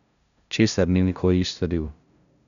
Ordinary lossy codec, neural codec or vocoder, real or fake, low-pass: none; codec, 16 kHz, 0.5 kbps, FunCodec, trained on LibriTTS, 25 frames a second; fake; 7.2 kHz